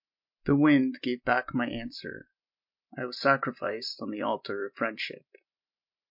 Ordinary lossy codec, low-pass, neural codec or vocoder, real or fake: MP3, 48 kbps; 5.4 kHz; none; real